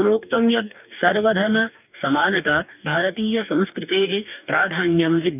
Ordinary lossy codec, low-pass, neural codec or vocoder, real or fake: none; 3.6 kHz; codec, 44.1 kHz, 2.6 kbps, DAC; fake